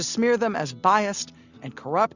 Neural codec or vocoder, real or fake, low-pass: none; real; 7.2 kHz